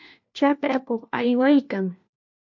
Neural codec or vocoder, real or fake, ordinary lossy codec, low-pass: codec, 16 kHz, 1 kbps, FunCodec, trained on LibriTTS, 50 frames a second; fake; MP3, 48 kbps; 7.2 kHz